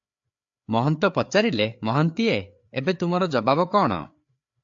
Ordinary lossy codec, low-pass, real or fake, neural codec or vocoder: AAC, 64 kbps; 7.2 kHz; fake; codec, 16 kHz, 4 kbps, FreqCodec, larger model